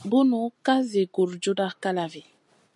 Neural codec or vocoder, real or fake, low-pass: none; real; 10.8 kHz